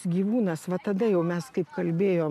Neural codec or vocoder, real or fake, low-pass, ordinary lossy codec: none; real; 14.4 kHz; MP3, 96 kbps